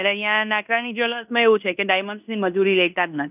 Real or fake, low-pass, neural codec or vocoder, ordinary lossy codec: fake; 3.6 kHz; codec, 16 kHz in and 24 kHz out, 0.9 kbps, LongCat-Audio-Codec, fine tuned four codebook decoder; none